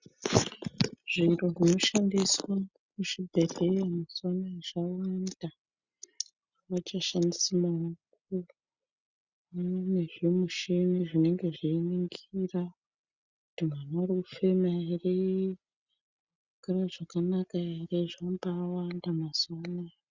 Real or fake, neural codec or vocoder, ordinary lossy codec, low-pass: real; none; Opus, 64 kbps; 7.2 kHz